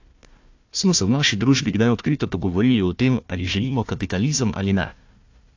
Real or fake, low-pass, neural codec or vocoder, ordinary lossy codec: fake; 7.2 kHz; codec, 16 kHz, 1 kbps, FunCodec, trained on Chinese and English, 50 frames a second; AAC, 48 kbps